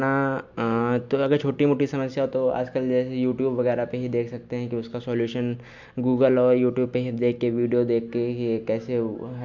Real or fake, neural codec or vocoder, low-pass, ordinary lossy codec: real; none; 7.2 kHz; MP3, 64 kbps